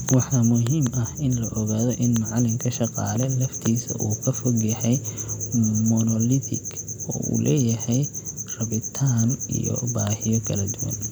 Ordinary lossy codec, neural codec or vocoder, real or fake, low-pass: none; none; real; none